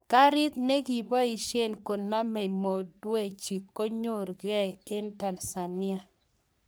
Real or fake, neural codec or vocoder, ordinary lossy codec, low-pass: fake; codec, 44.1 kHz, 3.4 kbps, Pupu-Codec; none; none